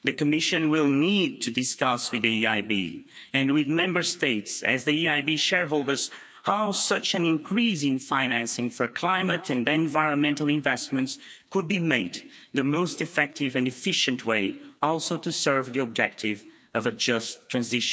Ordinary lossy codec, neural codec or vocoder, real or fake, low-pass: none; codec, 16 kHz, 2 kbps, FreqCodec, larger model; fake; none